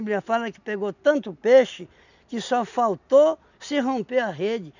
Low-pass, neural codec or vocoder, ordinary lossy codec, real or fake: 7.2 kHz; none; none; real